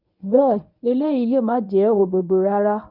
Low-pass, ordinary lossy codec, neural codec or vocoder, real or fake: 5.4 kHz; none; codec, 24 kHz, 0.9 kbps, WavTokenizer, medium speech release version 1; fake